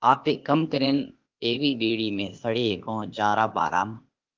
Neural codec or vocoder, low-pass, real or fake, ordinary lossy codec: codec, 16 kHz, 0.8 kbps, ZipCodec; 7.2 kHz; fake; Opus, 32 kbps